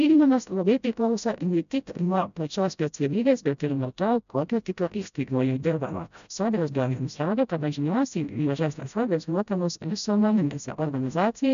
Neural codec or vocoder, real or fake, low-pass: codec, 16 kHz, 0.5 kbps, FreqCodec, smaller model; fake; 7.2 kHz